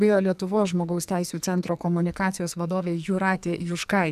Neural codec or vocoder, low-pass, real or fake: codec, 44.1 kHz, 2.6 kbps, SNAC; 14.4 kHz; fake